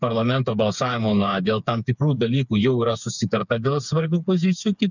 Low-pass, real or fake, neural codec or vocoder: 7.2 kHz; fake; codec, 16 kHz, 4 kbps, FreqCodec, smaller model